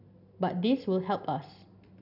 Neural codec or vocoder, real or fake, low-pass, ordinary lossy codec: none; real; 5.4 kHz; none